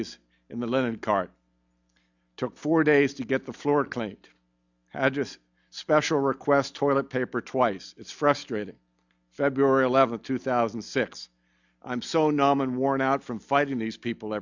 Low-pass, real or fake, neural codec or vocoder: 7.2 kHz; real; none